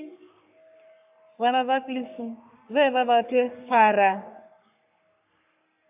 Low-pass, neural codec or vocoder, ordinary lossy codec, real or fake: 3.6 kHz; codec, 44.1 kHz, 3.4 kbps, Pupu-Codec; AAC, 32 kbps; fake